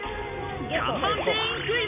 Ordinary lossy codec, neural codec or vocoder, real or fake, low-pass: none; none; real; 3.6 kHz